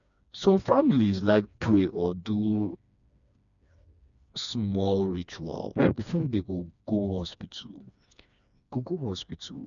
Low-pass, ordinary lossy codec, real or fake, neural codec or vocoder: 7.2 kHz; none; fake; codec, 16 kHz, 2 kbps, FreqCodec, smaller model